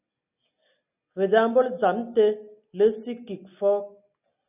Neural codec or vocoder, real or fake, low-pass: none; real; 3.6 kHz